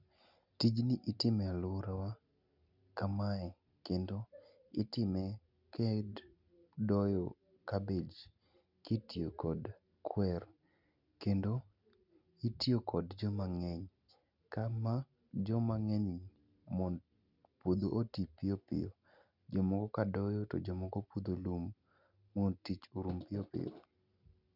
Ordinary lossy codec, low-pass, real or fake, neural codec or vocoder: none; 5.4 kHz; real; none